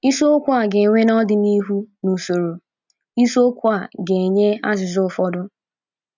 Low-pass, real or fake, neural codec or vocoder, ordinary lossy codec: 7.2 kHz; real; none; none